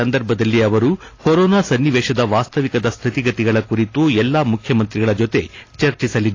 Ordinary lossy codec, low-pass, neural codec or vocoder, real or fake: AAC, 32 kbps; 7.2 kHz; none; real